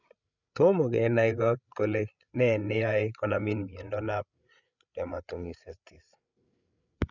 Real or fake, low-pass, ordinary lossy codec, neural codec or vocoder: fake; 7.2 kHz; none; codec, 16 kHz, 8 kbps, FreqCodec, larger model